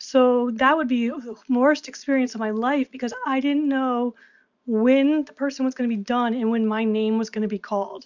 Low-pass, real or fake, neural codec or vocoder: 7.2 kHz; real; none